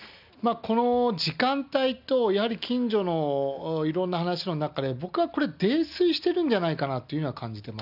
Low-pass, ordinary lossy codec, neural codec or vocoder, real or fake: 5.4 kHz; none; none; real